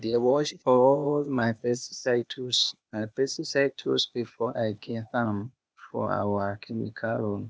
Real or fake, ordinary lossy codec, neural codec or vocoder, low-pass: fake; none; codec, 16 kHz, 0.8 kbps, ZipCodec; none